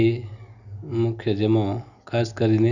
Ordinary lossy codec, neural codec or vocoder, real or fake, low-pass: none; none; real; 7.2 kHz